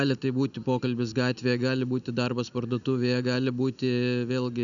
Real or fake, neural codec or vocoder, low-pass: real; none; 7.2 kHz